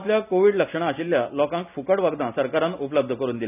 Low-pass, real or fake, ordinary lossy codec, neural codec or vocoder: 3.6 kHz; real; none; none